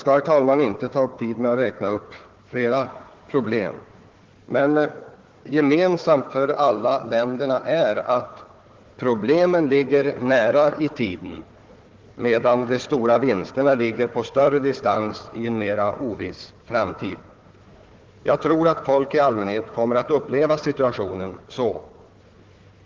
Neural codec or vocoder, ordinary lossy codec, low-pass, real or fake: codec, 16 kHz, 4 kbps, FunCodec, trained on Chinese and English, 50 frames a second; Opus, 16 kbps; 7.2 kHz; fake